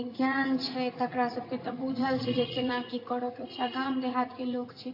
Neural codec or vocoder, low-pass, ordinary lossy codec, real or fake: vocoder, 44.1 kHz, 80 mel bands, Vocos; 5.4 kHz; AAC, 32 kbps; fake